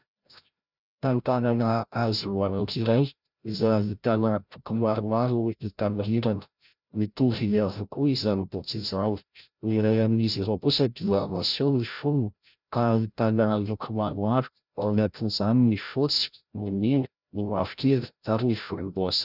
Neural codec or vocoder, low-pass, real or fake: codec, 16 kHz, 0.5 kbps, FreqCodec, larger model; 5.4 kHz; fake